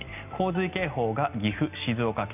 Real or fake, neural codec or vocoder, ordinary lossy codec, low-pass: real; none; none; 3.6 kHz